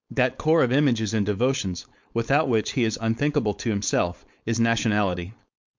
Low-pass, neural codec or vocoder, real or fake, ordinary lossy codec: 7.2 kHz; codec, 16 kHz, 4.8 kbps, FACodec; fake; MP3, 64 kbps